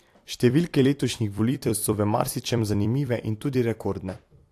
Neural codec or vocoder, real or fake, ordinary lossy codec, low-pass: vocoder, 44.1 kHz, 128 mel bands every 256 samples, BigVGAN v2; fake; AAC, 64 kbps; 14.4 kHz